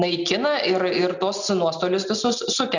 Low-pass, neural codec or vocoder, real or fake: 7.2 kHz; none; real